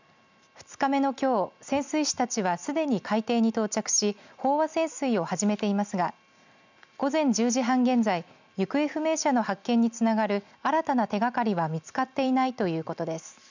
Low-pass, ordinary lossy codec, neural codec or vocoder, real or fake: 7.2 kHz; none; none; real